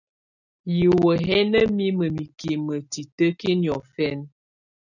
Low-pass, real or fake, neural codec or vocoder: 7.2 kHz; real; none